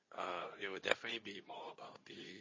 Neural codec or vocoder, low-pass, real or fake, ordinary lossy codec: codec, 24 kHz, 0.9 kbps, WavTokenizer, medium speech release version 2; 7.2 kHz; fake; MP3, 32 kbps